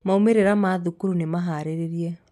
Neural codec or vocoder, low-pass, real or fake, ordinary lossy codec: none; 14.4 kHz; real; none